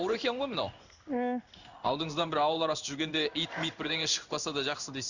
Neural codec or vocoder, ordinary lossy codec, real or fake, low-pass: codec, 16 kHz in and 24 kHz out, 1 kbps, XY-Tokenizer; none; fake; 7.2 kHz